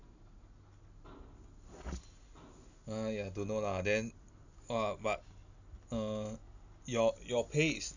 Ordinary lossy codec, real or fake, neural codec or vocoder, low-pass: none; real; none; 7.2 kHz